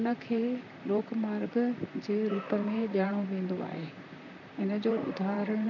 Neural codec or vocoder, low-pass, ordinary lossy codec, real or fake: vocoder, 44.1 kHz, 128 mel bands, Pupu-Vocoder; 7.2 kHz; none; fake